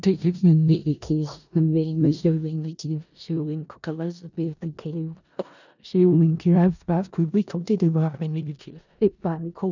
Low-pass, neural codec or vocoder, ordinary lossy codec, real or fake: 7.2 kHz; codec, 16 kHz in and 24 kHz out, 0.4 kbps, LongCat-Audio-Codec, four codebook decoder; none; fake